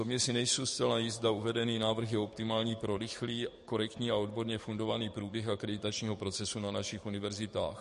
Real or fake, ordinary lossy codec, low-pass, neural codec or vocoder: fake; MP3, 48 kbps; 14.4 kHz; codec, 44.1 kHz, 7.8 kbps, DAC